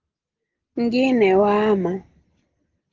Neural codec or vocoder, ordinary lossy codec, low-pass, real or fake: none; Opus, 16 kbps; 7.2 kHz; real